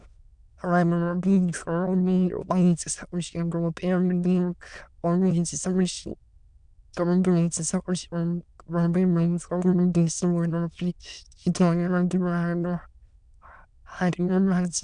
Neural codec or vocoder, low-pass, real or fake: autoencoder, 22.05 kHz, a latent of 192 numbers a frame, VITS, trained on many speakers; 9.9 kHz; fake